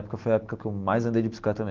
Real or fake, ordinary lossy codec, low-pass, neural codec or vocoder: real; Opus, 16 kbps; 7.2 kHz; none